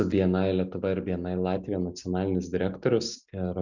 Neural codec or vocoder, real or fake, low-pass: none; real; 7.2 kHz